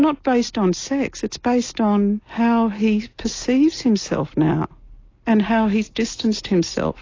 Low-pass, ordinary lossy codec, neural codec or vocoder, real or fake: 7.2 kHz; AAC, 32 kbps; none; real